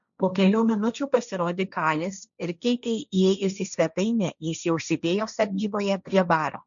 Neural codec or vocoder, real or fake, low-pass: codec, 16 kHz, 1.1 kbps, Voila-Tokenizer; fake; 7.2 kHz